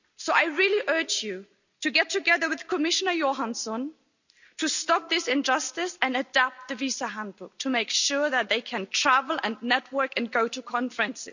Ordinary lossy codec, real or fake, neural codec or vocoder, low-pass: none; real; none; 7.2 kHz